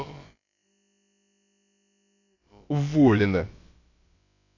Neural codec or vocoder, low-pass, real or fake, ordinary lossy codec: codec, 16 kHz, about 1 kbps, DyCAST, with the encoder's durations; 7.2 kHz; fake; AAC, 48 kbps